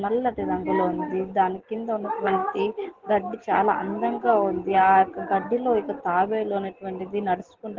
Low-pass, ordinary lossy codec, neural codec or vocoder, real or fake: 7.2 kHz; Opus, 16 kbps; none; real